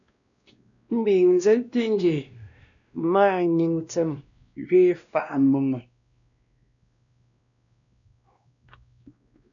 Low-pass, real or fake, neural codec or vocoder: 7.2 kHz; fake; codec, 16 kHz, 1 kbps, X-Codec, WavLM features, trained on Multilingual LibriSpeech